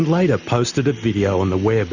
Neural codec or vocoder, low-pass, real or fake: none; 7.2 kHz; real